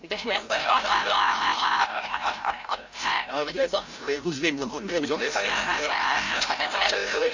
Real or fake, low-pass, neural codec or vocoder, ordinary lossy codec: fake; 7.2 kHz; codec, 16 kHz, 0.5 kbps, FreqCodec, larger model; AAC, 48 kbps